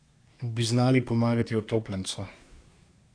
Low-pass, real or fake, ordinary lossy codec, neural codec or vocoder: 9.9 kHz; fake; AAC, 48 kbps; codec, 24 kHz, 1 kbps, SNAC